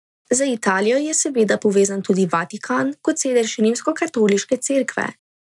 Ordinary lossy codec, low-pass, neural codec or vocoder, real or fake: none; 10.8 kHz; none; real